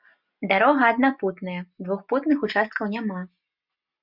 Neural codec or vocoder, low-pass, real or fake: none; 5.4 kHz; real